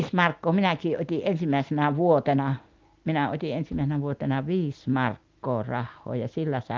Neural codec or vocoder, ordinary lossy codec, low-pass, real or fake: none; Opus, 24 kbps; 7.2 kHz; real